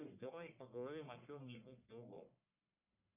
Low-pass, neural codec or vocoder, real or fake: 3.6 kHz; codec, 44.1 kHz, 1.7 kbps, Pupu-Codec; fake